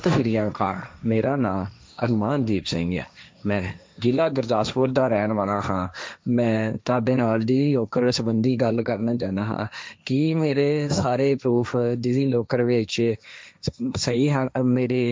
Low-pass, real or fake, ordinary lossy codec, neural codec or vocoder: none; fake; none; codec, 16 kHz, 1.1 kbps, Voila-Tokenizer